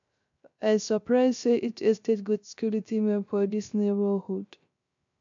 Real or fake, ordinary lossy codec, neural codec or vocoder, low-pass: fake; MP3, 64 kbps; codec, 16 kHz, 0.3 kbps, FocalCodec; 7.2 kHz